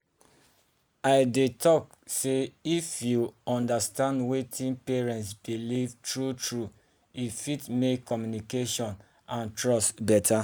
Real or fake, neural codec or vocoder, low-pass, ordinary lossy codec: real; none; none; none